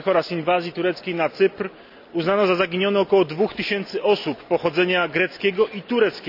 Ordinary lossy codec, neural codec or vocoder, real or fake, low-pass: none; none; real; 5.4 kHz